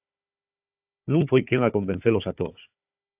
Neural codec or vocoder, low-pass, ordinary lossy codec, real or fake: codec, 16 kHz, 4 kbps, FunCodec, trained on Chinese and English, 50 frames a second; 3.6 kHz; AAC, 32 kbps; fake